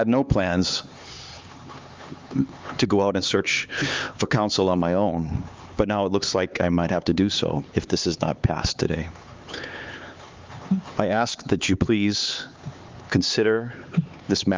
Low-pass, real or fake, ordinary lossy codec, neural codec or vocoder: 7.2 kHz; fake; Opus, 32 kbps; codec, 16 kHz, 4 kbps, X-Codec, HuBERT features, trained on LibriSpeech